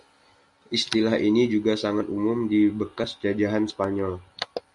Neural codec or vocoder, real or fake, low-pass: none; real; 10.8 kHz